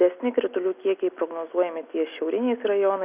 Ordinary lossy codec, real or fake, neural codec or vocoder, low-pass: Opus, 64 kbps; real; none; 3.6 kHz